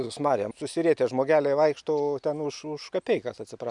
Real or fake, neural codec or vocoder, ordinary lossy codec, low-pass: real; none; MP3, 96 kbps; 10.8 kHz